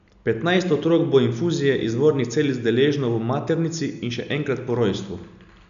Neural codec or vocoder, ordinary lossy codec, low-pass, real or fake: none; none; 7.2 kHz; real